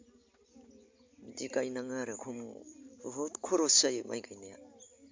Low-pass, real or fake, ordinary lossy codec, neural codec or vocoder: 7.2 kHz; real; MP3, 64 kbps; none